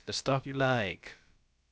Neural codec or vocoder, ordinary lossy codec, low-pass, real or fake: codec, 16 kHz, about 1 kbps, DyCAST, with the encoder's durations; none; none; fake